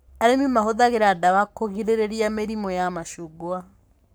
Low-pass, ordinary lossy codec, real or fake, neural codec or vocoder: none; none; fake; codec, 44.1 kHz, 7.8 kbps, Pupu-Codec